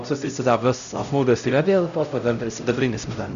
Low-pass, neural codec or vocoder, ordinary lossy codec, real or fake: 7.2 kHz; codec, 16 kHz, 0.5 kbps, X-Codec, HuBERT features, trained on LibriSpeech; MP3, 96 kbps; fake